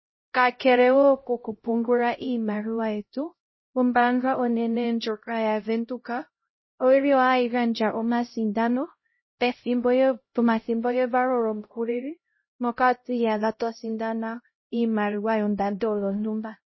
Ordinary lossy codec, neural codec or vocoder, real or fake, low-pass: MP3, 24 kbps; codec, 16 kHz, 0.5 kbps, X-Codec, HuBERT features, trained on LibriSpeech; fake; 7.2 kHz